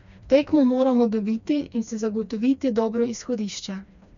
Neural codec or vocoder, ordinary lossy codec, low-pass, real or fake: codec, 16 kHz, 2 kbps, FreqCodec, smaller model; none; 7.2 kHz; fake